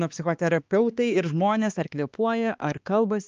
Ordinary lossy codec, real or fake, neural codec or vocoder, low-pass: Opus, 24 kbps; fake; codec, 16 kHz, 2 kbps, X-Codec, HuBERT features, trained on balanced general audio; 7.2 kHz